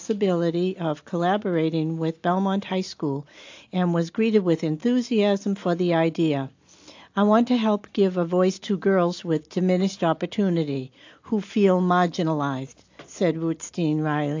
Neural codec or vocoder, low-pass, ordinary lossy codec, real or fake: none; 7.2 kHz; AAC, 48 kbps; real